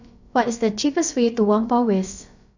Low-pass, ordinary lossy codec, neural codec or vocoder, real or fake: 7.2 kHz; none; codec, 16 kHz, about 1 kbps, DyCAST, with the encoder's durations; fake